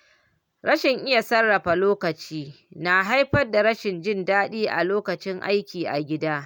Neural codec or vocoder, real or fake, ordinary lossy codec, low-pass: none; real; none; 19.8 kHz